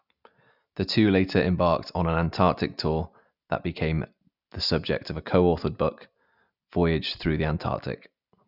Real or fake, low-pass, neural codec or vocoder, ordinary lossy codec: real; 5.4 kHz; none; none